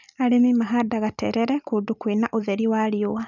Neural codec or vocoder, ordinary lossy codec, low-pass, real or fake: none; none; 7.2 kHz; real